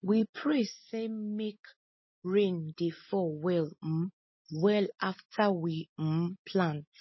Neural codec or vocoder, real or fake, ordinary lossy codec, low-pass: none; real; MP3, 24 kbps; 7.2 kHz